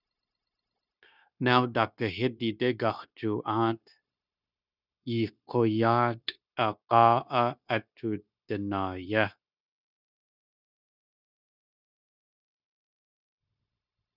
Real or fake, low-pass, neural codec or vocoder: fake; 5.4 kHz; codec, 16 kHz, 0.9 kbps, LongCat-Audio-Codec